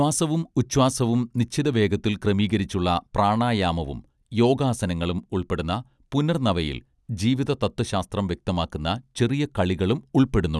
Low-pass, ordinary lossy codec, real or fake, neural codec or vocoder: none; none; real; none